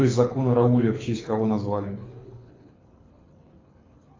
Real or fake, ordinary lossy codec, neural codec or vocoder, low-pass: fake; AAC, 32 kbps; codec, 24 kHz, 6 kbps, HILCodec; 7.2 kHz